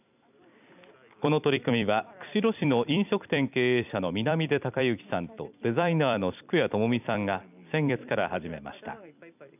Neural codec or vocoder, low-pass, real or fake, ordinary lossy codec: vocoder, 44.1 kHz, 128 mel bands every 256 samples, BigVGAN v2; 3.6 kHz; fake; none